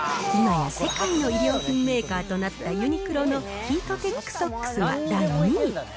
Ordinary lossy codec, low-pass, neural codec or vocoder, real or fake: none; none; none; real